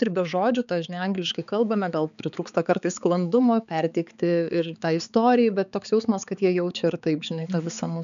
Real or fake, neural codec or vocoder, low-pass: fake; codec, 16 kHz, 4 kbps, X-Codec, HuBERT features, trained on balanced general audio; 7.2 kHz